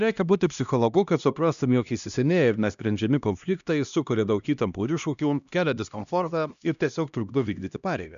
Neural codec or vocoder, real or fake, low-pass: codec, 16 kHz, 1 kbps, X-Codec, HuBERT features, trained on LibriSpeech; fake; 7.2 kHz